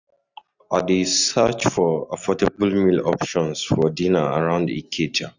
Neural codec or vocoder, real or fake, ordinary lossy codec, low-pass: none; real; none; 7.2 kHz